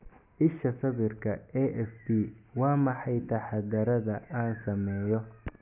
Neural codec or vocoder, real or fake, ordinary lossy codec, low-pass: none; real; none; 3.6 kHz